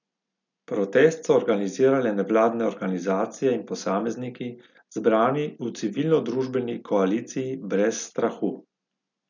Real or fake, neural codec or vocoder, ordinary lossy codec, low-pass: real; none; none; 7.2 kHz